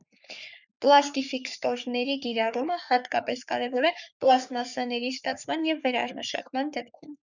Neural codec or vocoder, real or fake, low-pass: codec, 44.1 kHz, 3.4 kbps, Pupu-Codec; fake; 7.2 kHz